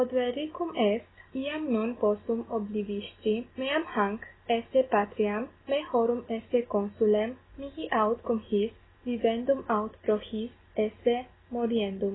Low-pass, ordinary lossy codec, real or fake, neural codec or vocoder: 7.2 kHz; AAC, 16 kbps; real; none